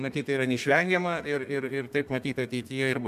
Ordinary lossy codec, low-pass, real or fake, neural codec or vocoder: MP3, 96 kbps; 14.4 kHz; fake; codec, 32 kHz, 1.9 kbps, SNAC